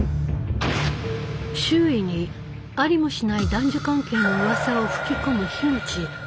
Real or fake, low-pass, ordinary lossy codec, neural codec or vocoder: real; none; none; none